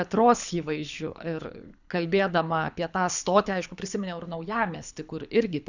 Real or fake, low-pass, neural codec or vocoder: fake; 7.2 kHz; codec, 24 kHz, 6 kbps, HILCodec